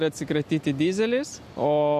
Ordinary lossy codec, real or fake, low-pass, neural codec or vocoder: MP3, 64 kbps; real; 14.4 kHz; none